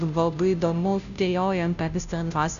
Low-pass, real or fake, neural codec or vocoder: 7.2 kHz; fake; codec, 16 kHz, 0.5 kbps, FunCodec, trained on Chinese and English, 25 frames a second